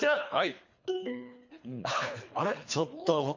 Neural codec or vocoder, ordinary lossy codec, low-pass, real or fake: codec, 24 kHz, 3 kbps, HILCodec; MP3, 48 kbps; 7.2 kHz; fake